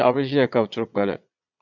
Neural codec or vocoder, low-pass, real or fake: vocoder, 22.05 kHz, 80 mel bands, Vocos; 7.2 kHz; fake